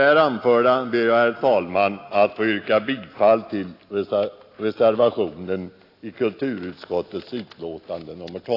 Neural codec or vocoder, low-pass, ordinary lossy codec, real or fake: none; 5.4 kHz; AAC, 32 kbps; real